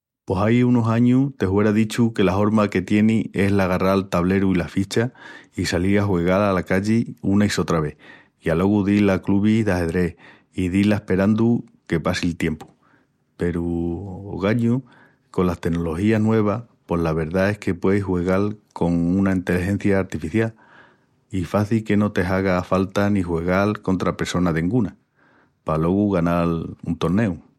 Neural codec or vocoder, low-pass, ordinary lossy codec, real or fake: none; 19.8 kHz; MP3, 64 kbps; real